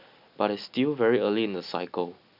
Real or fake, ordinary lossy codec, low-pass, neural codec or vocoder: real; none; 5.4 kHz; none